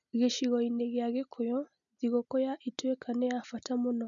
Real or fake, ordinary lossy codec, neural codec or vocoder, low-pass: real; none; none; 7.2 kHz